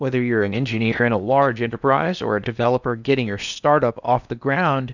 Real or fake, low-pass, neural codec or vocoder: fake; 7.2 kHz; codec, 16 kHz in and 24 kHz out, 0.8 kbps, FocalCodec, streaming, 65536 codes